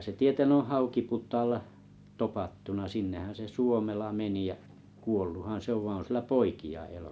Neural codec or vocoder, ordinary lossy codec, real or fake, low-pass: none; none; real; none